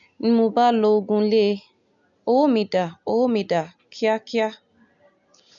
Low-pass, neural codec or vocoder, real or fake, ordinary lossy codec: 7.2 kHz; none; real; none